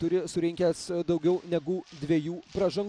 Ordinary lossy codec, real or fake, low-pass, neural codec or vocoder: MP3, 96 kbps; real; 9.9 kHz; none